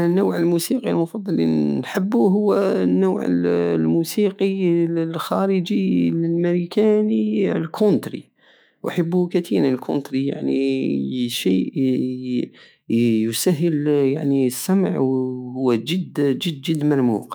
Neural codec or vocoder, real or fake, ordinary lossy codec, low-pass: autoencoder, 48 kHz, 128 numbers a frame, DAC-VAE, trained on Japanese speech; fake; none; none